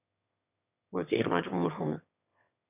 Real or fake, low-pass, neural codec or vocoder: fake; 3.6 kHz; autoencoder, 22.05 kHz, a latent of 192 numbers a frame, VITS, trained on one speaker